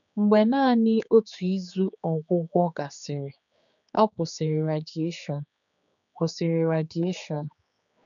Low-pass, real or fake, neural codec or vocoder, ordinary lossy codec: 7.2 kHz; fake; codec, 16 kHz, 4 kbps, X-Codec, HuBERT features, trained on general audio; none